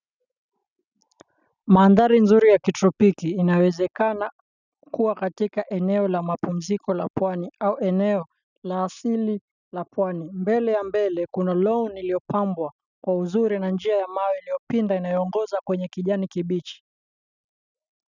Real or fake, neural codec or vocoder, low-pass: real; none; 7.2 kHz